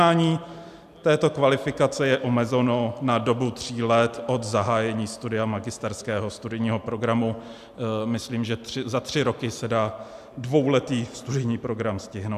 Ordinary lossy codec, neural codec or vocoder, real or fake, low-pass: AAC, 96 kbps; none; real; 14.4 kHz